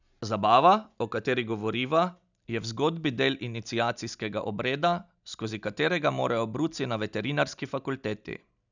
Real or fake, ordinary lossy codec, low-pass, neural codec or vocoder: real; none; 7.2 kHz; none